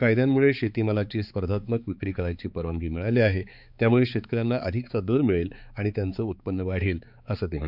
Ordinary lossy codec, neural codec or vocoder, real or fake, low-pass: none; codec, 16 kHz, 4 kbps, X-Codec, HuBERT features, trained on balanced general audio; fake; 5.4 kHz